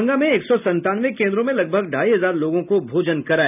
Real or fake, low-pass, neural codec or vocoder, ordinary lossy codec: real; 3.6 kHz; none; none